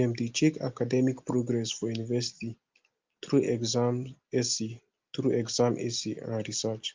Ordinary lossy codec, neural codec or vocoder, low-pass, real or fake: Opus, 32 kbps; none; 7.2 kHz; real